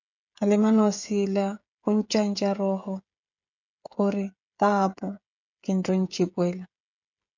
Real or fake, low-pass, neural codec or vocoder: fake; 7.2 kHz; codec, 16 kHz, 16 kbps, FreqCodec, smaller model